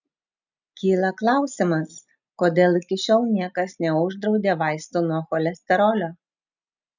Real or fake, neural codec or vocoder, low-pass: real; none; 7.2 kHz